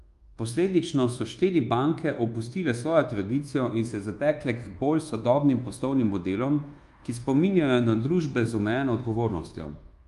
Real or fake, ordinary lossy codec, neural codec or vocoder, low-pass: fake; Opus, 32 kbps; codec, 24 kHz, 1.2 kbps, DualCodec; 10.8 kHz